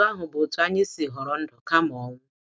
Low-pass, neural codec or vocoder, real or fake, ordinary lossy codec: none; none; real; none